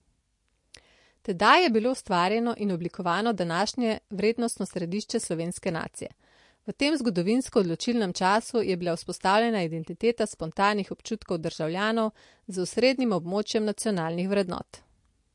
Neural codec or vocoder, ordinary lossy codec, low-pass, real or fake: none; MP3, 48 kbps; 10.8 kHz; real